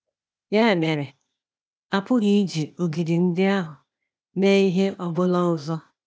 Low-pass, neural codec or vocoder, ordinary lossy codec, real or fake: none; codec, 16 kHz, 0.8 kbps, ZipCodec; none; fake